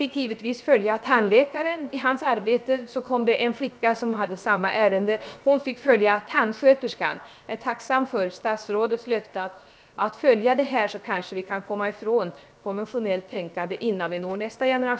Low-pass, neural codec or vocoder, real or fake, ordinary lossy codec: none; codec, 16 kHz, 0.7 kbps, FocalCodec; fake; none